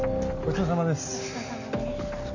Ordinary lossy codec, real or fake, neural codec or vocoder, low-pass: none; real; none; 7.2 kHz